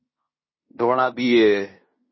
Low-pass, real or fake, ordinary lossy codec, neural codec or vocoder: 7.2 kHz; fake; MP3, 24 kbps; codec, 16 kHz in and 24 kHz out, 0.9 kbps, LongCat-Audio-Codec, fine tuned four codebook decoder